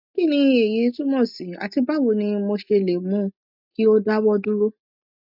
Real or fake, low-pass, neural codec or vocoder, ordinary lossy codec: real; 5.4 kHz; none; none